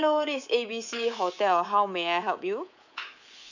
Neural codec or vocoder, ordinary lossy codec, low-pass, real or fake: codec, 24 kHz, 3.1 kbps, DualCodec; none; 7.2 kHz; fake